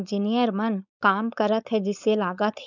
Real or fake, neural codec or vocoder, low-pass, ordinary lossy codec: fake; codec, 16 kHz, 4.8 kbps, FACodec; 7.2 kHz; none